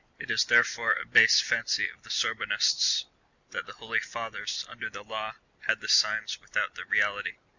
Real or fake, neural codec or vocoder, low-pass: real; none; 7.2 kHz